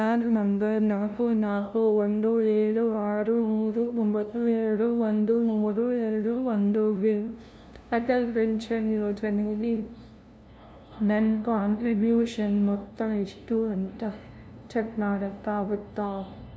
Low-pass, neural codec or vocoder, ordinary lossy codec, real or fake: none; codec, 16 kHz, 0.5 kbps, FunCodec, trained on LibriTTS, 25 frames a second; none; fake